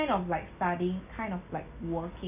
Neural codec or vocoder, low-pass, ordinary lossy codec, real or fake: none; 3.6 kHz; none; real